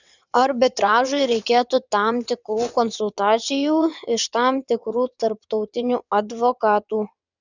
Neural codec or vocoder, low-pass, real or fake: vocoder, 22.05 kHz, 80 mel bands, WaveNeXt; 7.2 kHz; fake